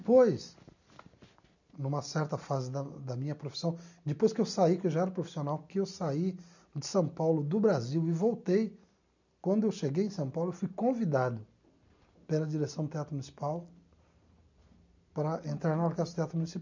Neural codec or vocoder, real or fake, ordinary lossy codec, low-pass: none; real; none; 7.2 kHz